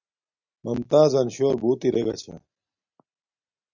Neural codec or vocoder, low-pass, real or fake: none; 7.2 kHz; real